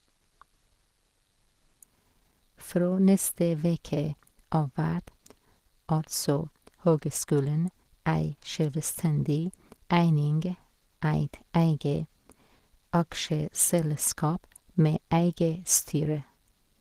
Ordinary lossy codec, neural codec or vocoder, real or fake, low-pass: Opus, 16 kbps; none; real; 14.4 kHz